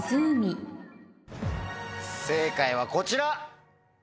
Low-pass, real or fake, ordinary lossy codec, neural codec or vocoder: none; real; none; none